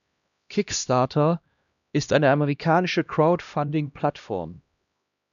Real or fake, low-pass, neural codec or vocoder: fake; 7.2 kHz; codec, 16 kHz, 1 kbps, X-Codec, HuBERT features, trained on LibriSpeech